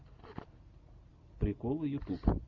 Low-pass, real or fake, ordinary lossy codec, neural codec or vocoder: 7.2 kHz; real; Opus, 64 kbps; none